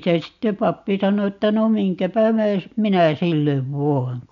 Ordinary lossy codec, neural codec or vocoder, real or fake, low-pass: none; none; real; 7.2 kHz